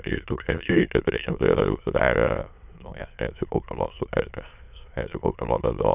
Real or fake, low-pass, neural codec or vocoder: fake; 3.6 kHz; autoencoder, 22.05 kHz, a latent of 192 numbers a frame, VITS, trained on many speakers